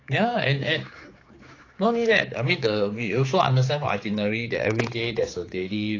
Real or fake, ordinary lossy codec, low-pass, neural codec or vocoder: fake; AAC, 32 kbps; 7.2 kHz; codec, 16 kHz, 4 kbps, X-Codec, HuBERT features, trained on general audio